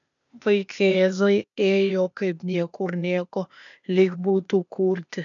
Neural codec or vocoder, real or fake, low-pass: codec, 16 kHz, 0.8 kbps, ZipCodec; fake; 7.2 kHz